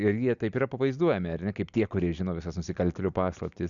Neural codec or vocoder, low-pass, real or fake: none; 7.2 kHz; real